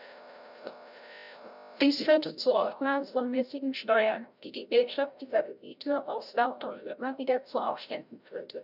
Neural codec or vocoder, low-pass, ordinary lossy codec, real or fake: codec, 16 kHz, 0.5 kbps, FreqCodec, larger model; 5.4 kHz; none; fake